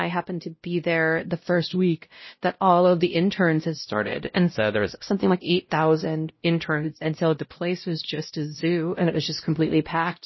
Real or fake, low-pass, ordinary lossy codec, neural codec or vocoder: fake; 7.2 kHz; MP3, 24 kbps; codec, 16 kHz, 0.5 kbps, X-Codec, WavLM features, trained on Multilingual LibriSpeech